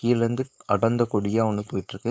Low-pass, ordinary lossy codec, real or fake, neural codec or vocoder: none; none; fake; codec, 16 kHz, 4.8 kbps, FACodec